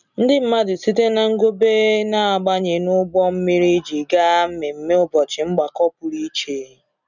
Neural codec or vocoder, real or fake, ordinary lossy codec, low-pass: none; real; none; 7.2 kHz